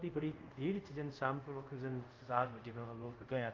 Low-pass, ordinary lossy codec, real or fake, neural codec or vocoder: 7.2 kHz; Opus, 24 kbps; fake; codec, 24 kHz, 0.5 kbps, DualCodec